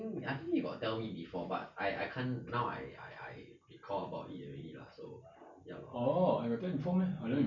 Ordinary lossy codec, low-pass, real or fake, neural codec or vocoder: none; 7.2 kHz; real; none